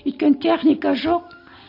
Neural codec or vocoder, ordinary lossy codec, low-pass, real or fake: none; AAC, 32 kbps; 5.4 kHz; real